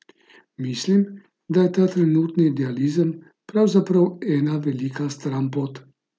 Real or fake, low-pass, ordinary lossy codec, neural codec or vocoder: real; none; none; none